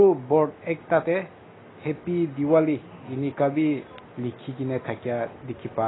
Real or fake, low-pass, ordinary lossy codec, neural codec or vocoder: real; 7.2 kHz; AAC, 16 kbps; none